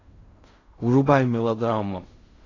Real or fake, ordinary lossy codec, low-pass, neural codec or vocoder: fake; AAC, 32 kbps; 7.2 kHz; codec, 16 kHz in and 24 kHz out, 0.4 kbps, LongCat-Audio-Codec, fine tuned four codebook decoder